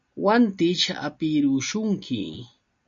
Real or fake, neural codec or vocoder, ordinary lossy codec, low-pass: real; none; MP3, 48 kbps; 7.2 kHz